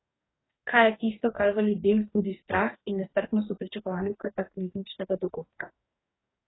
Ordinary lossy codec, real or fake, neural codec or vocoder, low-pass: AAC, 16 kbps; fake; codec, 44.1 kHz, 2.6 kbps, DAC; 7.2 kHz